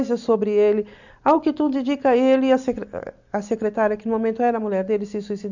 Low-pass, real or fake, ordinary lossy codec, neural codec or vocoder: 7.2 kHz; real; none; none